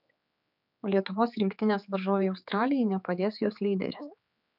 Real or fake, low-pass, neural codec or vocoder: fake; 5.4 kHz; codec, 16 kHz, 4 kbps, X-Codec, HuBERT features, trained on general audio